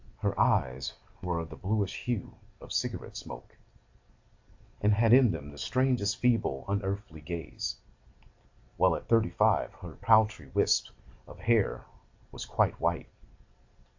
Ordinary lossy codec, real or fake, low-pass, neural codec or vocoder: AAC, 48 kbps; real; 7.2 kHz; none